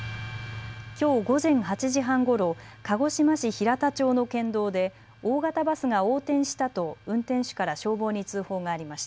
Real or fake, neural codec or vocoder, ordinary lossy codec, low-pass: real; none; none; none